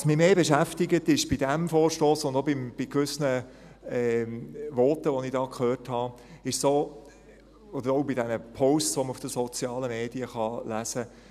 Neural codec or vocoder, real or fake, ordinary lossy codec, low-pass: none; real; none; 14.4 kHz